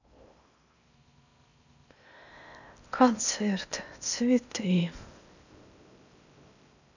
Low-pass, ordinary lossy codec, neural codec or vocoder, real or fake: 7.2 kHz; none; codec, 16 kHz in and 24 kHz out, 0.6 kbps, FocalCodec, streaming, 4096 codes; fake